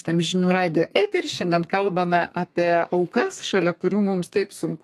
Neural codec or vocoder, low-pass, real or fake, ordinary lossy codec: codec, 32 kHz, 1.9 kbps, SNAC; 14.4 kHz; fake; AAC, 64 kbps